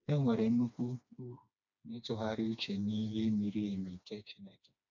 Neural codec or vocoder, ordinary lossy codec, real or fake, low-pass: codec, 16 kHz, 2 kbps, FreqCodec, smaller model; none; fake; 7.2 kHz